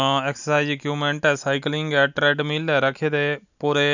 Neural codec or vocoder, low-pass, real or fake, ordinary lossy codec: none; 7.2 kHz; real; none